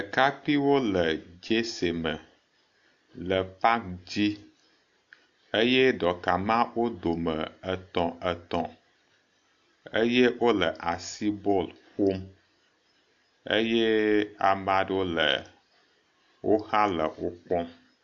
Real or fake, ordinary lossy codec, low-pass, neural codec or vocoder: real; AAC, 64 kbps; 7.2 kHz; none